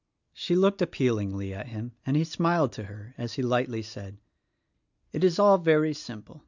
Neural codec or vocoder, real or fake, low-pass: none; real; 7.2 kHz